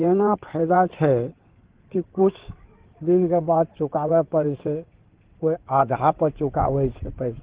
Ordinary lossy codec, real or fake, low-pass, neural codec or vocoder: Opus, 16 kbps; fake; 3.6 kHz; codec, 16 kHz in and 24 kHz out, 2.2 kbps, FireRedTTS-2 codec